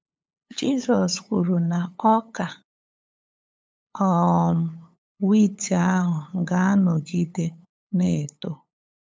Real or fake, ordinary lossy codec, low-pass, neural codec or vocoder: fake; none; none; codec, 16 kHz, 8 kbps, FunCodec, trained on LibriTTS, 25 frames a second